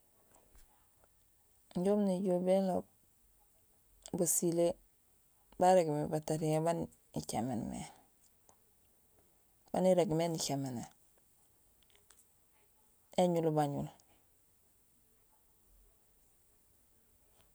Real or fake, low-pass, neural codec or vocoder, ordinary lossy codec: real; none; none; none